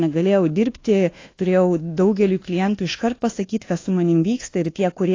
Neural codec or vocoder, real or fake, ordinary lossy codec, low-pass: codec, 24 kHz, 1.2 kbps, DualCodec; fake; AAC, 32 kbps; 7.2 kHz